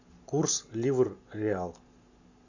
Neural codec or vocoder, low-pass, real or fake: none; 7.2 kHz; real